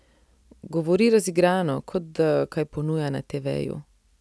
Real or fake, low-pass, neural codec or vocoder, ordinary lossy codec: real; none; none; none